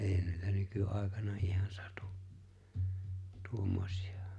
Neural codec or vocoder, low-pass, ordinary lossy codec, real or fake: none; none; none; real